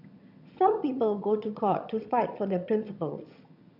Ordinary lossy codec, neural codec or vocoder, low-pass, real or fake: MP3, 48 kbps; vocoder, 22.05 kHz, 80 mel bands, HiFi-GAN; 5.4 kHz; fake